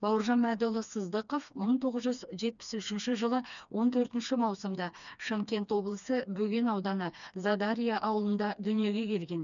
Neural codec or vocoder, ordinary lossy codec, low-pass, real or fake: codec, 16 kHz, 2 kbps, FreqCodec, smaller model; none; 7.2 kHz; fake